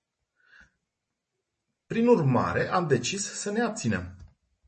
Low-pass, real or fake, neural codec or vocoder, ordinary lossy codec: 10.8 kHz; real; none; MP3, 32 kbps